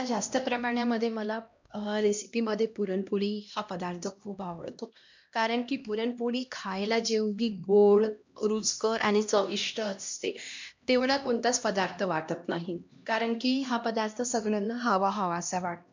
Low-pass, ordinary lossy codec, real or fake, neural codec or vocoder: 7.2 kHz; MP3, 64 kbps; fake; codec, 16 kHz, 1 kbps, X-Codec, HuBERT features, trained on LibriSpeech